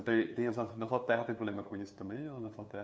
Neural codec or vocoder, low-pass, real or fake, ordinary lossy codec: codec, 16 kHz, 2 kbps, FunCodec, trained on LibriTTS, 25 frames a second; none; fake; none